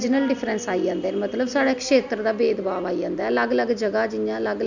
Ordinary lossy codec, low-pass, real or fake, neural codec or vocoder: none; 7.2 kHz; real; none